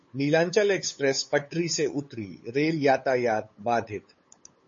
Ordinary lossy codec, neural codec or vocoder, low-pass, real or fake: MP3, 32 kbps; codec, 16 kHz, 8 kbps, FunCodec, trained on LibriTTS, 25 frames a second; 7.2 kHz; fake